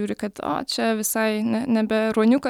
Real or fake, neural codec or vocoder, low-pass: real; none; 19.8 kHz